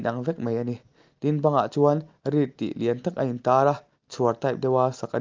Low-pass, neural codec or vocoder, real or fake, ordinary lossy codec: 7.2 kHz; none; real; Opus, 24 kbps